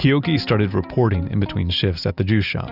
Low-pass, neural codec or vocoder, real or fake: 5.4 kHz; none; real